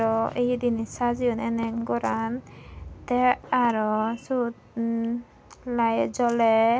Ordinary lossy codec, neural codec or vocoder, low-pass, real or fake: none; none; none; real